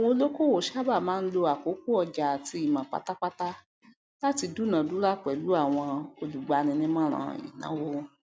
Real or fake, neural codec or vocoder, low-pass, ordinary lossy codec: real; none; none; none